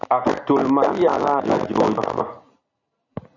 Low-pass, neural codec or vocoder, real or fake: 7.2 kHz; none; real